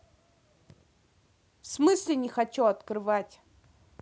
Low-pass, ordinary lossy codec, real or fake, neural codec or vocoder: none; none; real; none